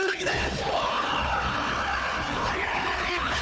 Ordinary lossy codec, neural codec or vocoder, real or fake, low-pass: none; codec, 16 kHz, 4 kbps, FunCodec, trained on Chinese and English, 50 frames a second; fake; none